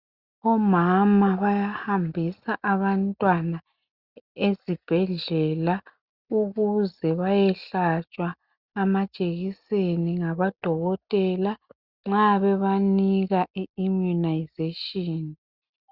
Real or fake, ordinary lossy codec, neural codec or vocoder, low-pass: real; Opus, 64 kbps; none; 5.4 kHz